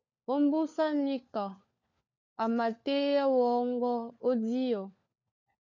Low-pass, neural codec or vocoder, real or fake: 7.2 kHz; codec, 16 kHz, 4 kbps, FunCodec, trained on LibriTTS, 50 frames a second; fake